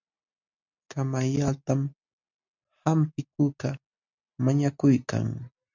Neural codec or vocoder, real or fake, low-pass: none; real; 7.2 kHz